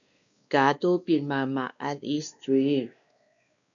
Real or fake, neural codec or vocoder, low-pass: fake; codec, 16 kHz, 2 kbps, X-Codec, WavLM features, trained on Multilingual LibriSpeech; 7.2 kHz